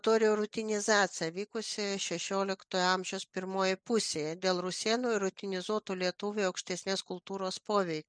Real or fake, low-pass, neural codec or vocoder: real; 9.9 kHz; none